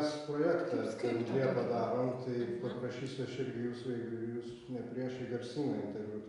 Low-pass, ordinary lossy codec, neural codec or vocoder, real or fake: 10.8 kHz; Opus, 64 kbps; none; real